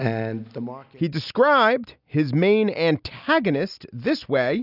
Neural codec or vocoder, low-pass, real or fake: none; 5.4 kHz; real